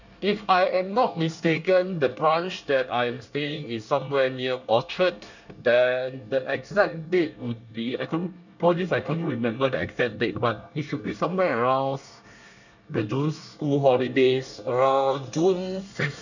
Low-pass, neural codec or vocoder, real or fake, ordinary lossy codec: 7.2 kHz; codec, 24 kHz, 1 kbps, SNAC; fake; none